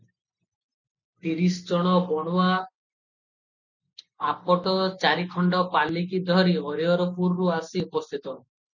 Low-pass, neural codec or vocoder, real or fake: 7.2 kHz; none; real